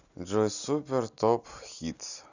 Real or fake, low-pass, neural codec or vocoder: real; 7.2 kHz; none